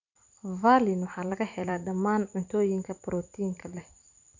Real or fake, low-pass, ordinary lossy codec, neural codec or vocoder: fake; 7.2 kHz; none; vocoder, 44.1 kHz, 128 mel bands every 512 samples, BigVGAN v2